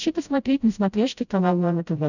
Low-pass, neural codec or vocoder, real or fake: 7.2 kHz; codec, 16 kHz, 0.5 kbps, FreqCodec, smaller model; fake